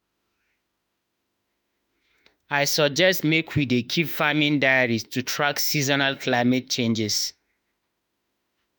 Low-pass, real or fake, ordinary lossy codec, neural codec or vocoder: none; fake; none; autoencoder, 48 kHz, 32 numbers a frame, DAC-VAE, trained on Japanese speech